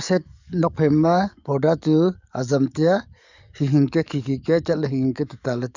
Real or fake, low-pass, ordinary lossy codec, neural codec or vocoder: fake; 7.2 kHz; none; codec, 44.1 kHz, 7.8 kbps, DAC